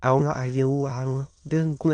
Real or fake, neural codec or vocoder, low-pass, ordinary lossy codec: fake; autoencoder, 22.05 kHz, a latent of 192 numbers a frame, VITS, trained on many speakers; 9.9 kHz; AAC, 48 kbps